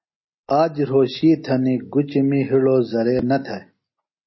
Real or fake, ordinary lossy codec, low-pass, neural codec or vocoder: real; MP3, 24 kbps; 7.2 kHz; none